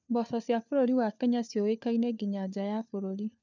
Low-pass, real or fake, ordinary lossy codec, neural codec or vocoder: 7.2 kHz; fake; none; codec, 16 kHz, 4 kbps, FunCodec, trained on LibriTTS, 50 frames a second